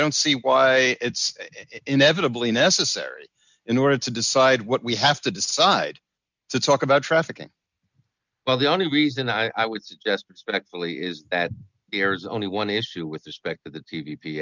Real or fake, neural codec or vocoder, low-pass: real; none; 7.2 kHz